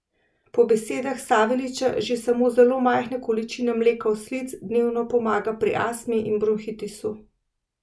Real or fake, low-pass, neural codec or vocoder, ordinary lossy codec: real; none; none; none